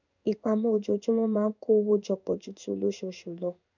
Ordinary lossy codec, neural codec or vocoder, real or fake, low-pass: none; codec, 16 kHz in and 24 kHz out, 1 kbps, XY-Tokenizer; fake; 7.2 kHz